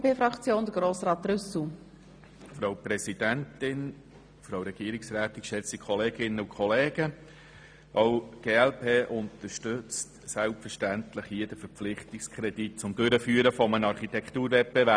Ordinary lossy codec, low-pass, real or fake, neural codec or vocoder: none; 9.9 kHz; real; none